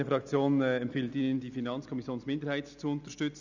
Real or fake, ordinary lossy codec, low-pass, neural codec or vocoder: real; none; 7.2 kHz; none